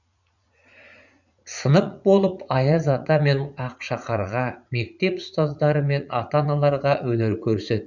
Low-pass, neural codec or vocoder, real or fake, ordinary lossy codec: 7.2 kHz; vocoder, 44.1 kHz, 80 mel bands, Vocos; fake; none